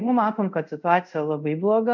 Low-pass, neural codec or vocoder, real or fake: 7.2 kHz; codec, 24 kHz, 0.5 kbps, DualCodec; fake